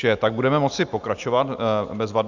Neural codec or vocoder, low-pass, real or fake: none; 7.2 kHz; real